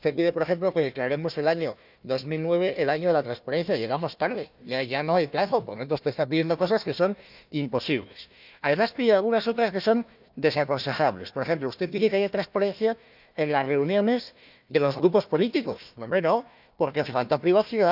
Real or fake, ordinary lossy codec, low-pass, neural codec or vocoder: fake; none; 5.4 kHz; codec, 16 kHz, 1 kbps, FunCodec, trained on Chinese and English, 50 frames a second